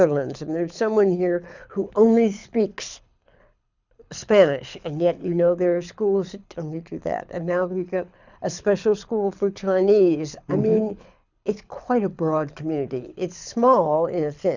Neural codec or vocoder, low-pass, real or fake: codec, 24 kHz, 6 kbps, HILCodec; 7.2 kHz; fake